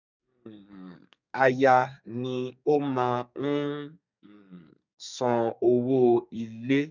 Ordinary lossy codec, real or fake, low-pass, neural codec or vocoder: none; fake; 7.2 kHz; codec, 32 kHz, 1.9 kbps, SNAC